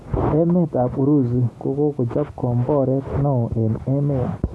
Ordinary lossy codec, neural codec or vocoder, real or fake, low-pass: none; none; real; none